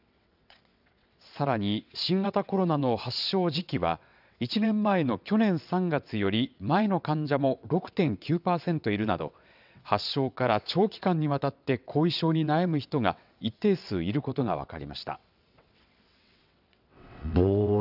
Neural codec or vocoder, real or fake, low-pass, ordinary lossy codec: vocoder, 22.05 kHz, 80 mel bands, WaveNeXt; fake; 5.4 kHz; none